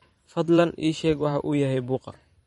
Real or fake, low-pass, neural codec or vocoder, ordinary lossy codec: fake; 19.8 kHz; vocoder, 44.1 kHz, 128 mel bands every 512 samples, BigVGAN v2; MP3, 48 kbps